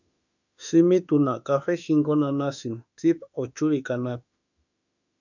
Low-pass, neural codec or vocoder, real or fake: 7.2 kHz; autoencoder, 48 kHz, 32 numbers a frame, DAC-VAE, trained on Japanese speech; fake